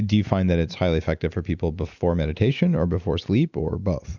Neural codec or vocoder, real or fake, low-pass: none; real; 7.2 kHz